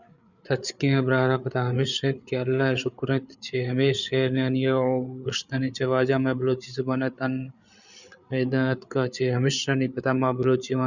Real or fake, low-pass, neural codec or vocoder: fake; 7.2 kHz; codec, 16 kHz, 8 kbps, FreqCodec, larger model